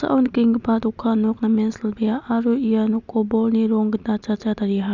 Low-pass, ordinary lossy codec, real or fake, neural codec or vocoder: 7.2 kHz; none; real; none